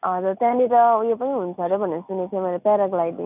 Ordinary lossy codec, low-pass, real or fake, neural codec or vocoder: none; 3.6 kHz; real; none